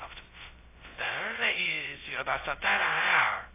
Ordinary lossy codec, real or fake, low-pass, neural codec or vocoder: AAC, 24 kbps; fake; 3.6 kHz; codec, 16 kHz, 0.2 kbps, FocalCodec